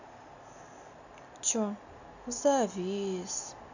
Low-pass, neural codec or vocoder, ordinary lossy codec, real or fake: 7.2 kHz; none; none; real